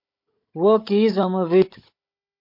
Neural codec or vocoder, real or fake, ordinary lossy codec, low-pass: codec, 16 kHz, 16 kbps, FunCodec, trained on Chinese and English, 50 frames a second; fake; MP3, 32 kbps; 5.4 kHz